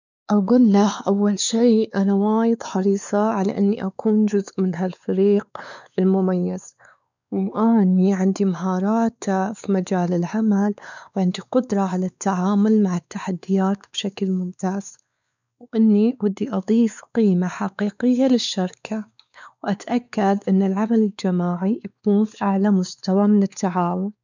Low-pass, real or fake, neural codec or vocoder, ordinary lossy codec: 7.2 kHz; fake; codec, 16 kHz, 4 kbps, X-Codec, WavLM features, trained on Multilingual LibriSpeech; none